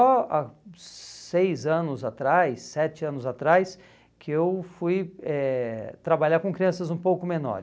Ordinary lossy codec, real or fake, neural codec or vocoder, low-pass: none; real; none; none